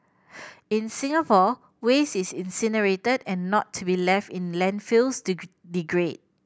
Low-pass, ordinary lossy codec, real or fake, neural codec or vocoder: none; none; real; none